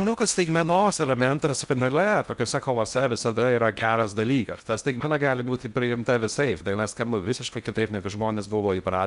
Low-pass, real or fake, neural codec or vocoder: 10.8 kHz; fake; codec, 16 kHz in and 24 kHz out, 0.6 kbps, FocalCodec, streaming, 4096 codes